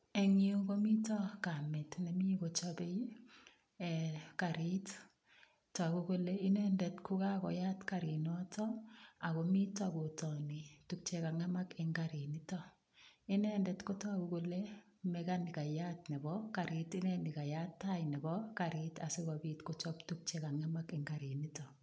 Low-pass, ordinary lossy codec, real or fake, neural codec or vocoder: none; none; real; none